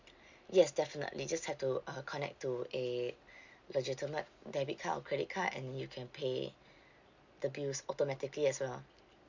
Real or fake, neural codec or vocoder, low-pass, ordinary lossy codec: real; none; 7.2 kHz; Opus, 64 kbps